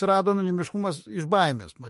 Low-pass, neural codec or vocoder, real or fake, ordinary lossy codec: 14.4 kHz; autoencoder, 48 kHz, 32 numbers a frame, DAC-VAE, trained on Japanese speech; fake; MP3, 48 kbps